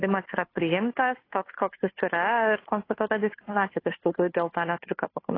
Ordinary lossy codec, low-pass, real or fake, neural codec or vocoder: AAC, 24 kbps; 5.4 kHz; fake; codec, 16 kHz, 2 kbps, FunCodec, trained on Chinese and English, 25 frames a second